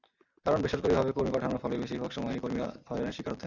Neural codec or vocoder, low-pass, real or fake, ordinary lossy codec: none; 7.2 kHz; real; Opus, 64 kbps